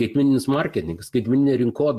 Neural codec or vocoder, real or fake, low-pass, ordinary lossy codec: none; real; 14.4 kHz; MP3, 64 kbps